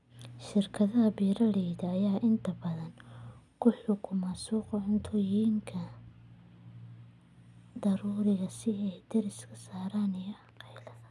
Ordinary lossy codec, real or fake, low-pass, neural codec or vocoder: none; real; none; none